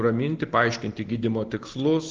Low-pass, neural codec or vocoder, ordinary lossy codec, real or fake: 7.2 kHz; none; Opus, 16 kbps; real